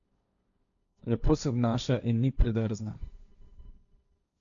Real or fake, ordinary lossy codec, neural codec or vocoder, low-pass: fake; none; codec, 16 kHz, 1.1 kbps, Voila-Tokenizer; 7.2 kHz